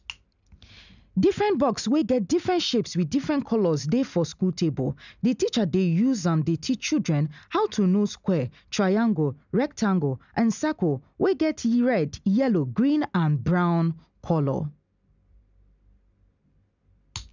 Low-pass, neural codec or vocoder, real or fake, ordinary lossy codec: 7.2 kHz; none; real; none